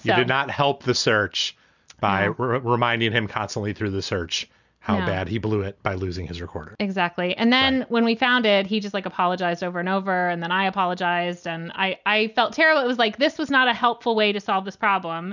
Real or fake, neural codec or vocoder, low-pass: real; none; 7.2 kHz